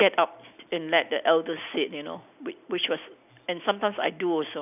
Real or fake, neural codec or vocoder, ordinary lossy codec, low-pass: real; none; none; 3.6 kHz